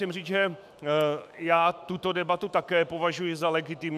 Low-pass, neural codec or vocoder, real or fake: 14.4 kHz; autoencoder, 48 kHz, 128 numbers a frame, DAC-VAE, trained on Japanese speech; fake